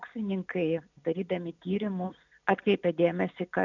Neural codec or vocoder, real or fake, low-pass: none; real; 7.2 kHz